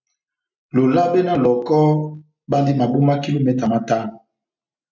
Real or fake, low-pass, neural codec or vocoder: real; 7.2 kHz; none